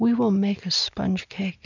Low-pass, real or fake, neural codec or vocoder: 7.2 kHz; real; none